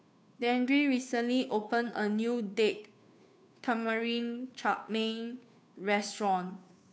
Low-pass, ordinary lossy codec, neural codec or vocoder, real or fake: none; none; codec, 16 kHz, 2 kbps, FunCodec, trained on Chinese and English, 25 frames a second; fake